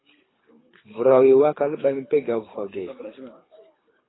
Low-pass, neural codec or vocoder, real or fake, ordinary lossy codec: 7.2 kHz; codec, 24 kHz, 6 kbps, HILCodec; fake; AAC, 16 kbps